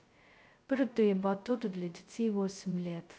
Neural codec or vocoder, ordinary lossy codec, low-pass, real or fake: codec, 16 kHz, 0.2 kbps, FocalCodec; none; none; fake